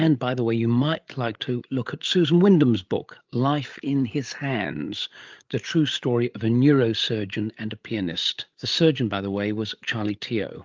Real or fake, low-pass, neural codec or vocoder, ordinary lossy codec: fake; 7.2 kHz; codec, 16 kHz, 8 kbps, FreqCodec, larger model; Opus, 24 kbps